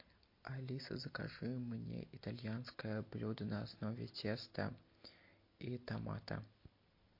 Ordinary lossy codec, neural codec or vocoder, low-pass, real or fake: MP3, 32 kbps; none; 5.4 kHz; real